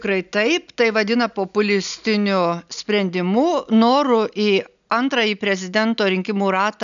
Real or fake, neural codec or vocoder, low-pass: real; none; 7.2 kHz